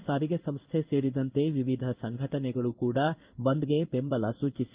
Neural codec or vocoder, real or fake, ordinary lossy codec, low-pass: codec, 16 kHz in and 24 kHz out, 1 kbps, XY-Tokenizer; fake; Opus, 24 kbps; 3.6 kHz